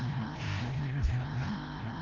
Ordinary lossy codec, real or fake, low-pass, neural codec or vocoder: Opus, 16 kbps; fake; 7.2 kHz; codec, 16 kHz, 0.5 kbps, FreqCodec, larger model